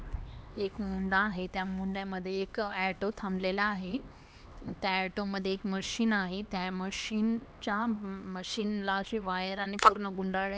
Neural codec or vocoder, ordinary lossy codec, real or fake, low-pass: codec, 16 kHz, 2 kbps, X-Codec, HuBERT features, trained on LibriSpeech; none; fake; none